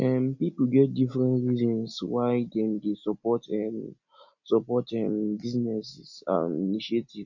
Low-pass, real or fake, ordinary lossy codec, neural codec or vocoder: 7.2 kHz; real; none; none